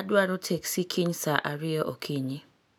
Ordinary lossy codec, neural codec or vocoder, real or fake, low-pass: none; none; real; none